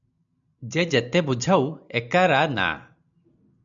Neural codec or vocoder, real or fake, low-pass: none; real; 7.2 kHz